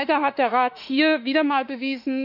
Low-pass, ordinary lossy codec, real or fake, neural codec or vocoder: 5.4 kHz; none; fake; autoencoder, 48 kHz, 32 numbers a frame, DAC-VAE, trained on Japanese speech